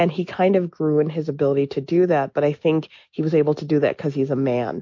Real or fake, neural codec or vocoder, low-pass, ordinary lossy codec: real; none; 7.2 kHz; MP3, 48 kbps